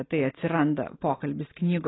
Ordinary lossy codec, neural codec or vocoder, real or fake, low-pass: AAC, 16 kbps; none; real; 7.2 kHz